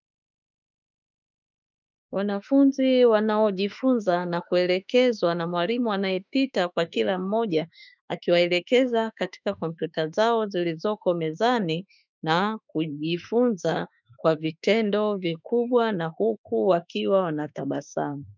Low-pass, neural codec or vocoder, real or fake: 7.2 kHz; autoencoder, 48 kHz, 32 numbers a frame, DAC-VAE, trained on Japanese speech; fake